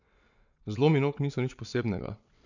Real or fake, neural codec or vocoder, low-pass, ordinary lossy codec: fake; vocoder, 22.05 kHz, 80 mel bands, WaveNeXt; 7.2 kHz; none